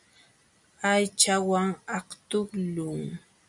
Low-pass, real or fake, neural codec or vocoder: 10.8 kHz; real; none